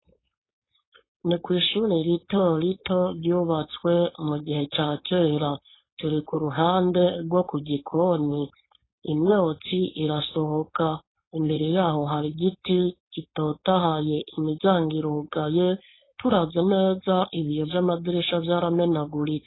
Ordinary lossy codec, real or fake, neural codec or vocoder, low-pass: AAC, 16 kbps; fake; codec, 16 kHz, 4.8 kbps, FACodec; 7.2 kHz